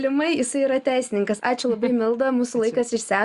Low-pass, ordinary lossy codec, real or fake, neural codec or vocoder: 10.8 kHz; Opus, 64 kbps; real; none